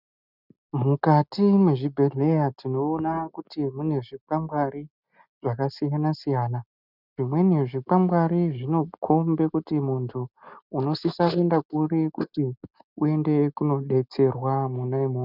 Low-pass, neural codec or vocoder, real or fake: 5.4 kHz; none; real